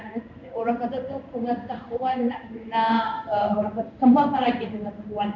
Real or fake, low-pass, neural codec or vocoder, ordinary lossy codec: fake; 7.2 kHz; codec, 16 kHz in and 24 kHz out, 1 kbps, XY-Tokenizer; none